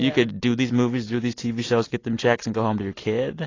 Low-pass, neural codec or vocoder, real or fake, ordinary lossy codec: 7.2 kHz; none; real; AAC, 32 kbps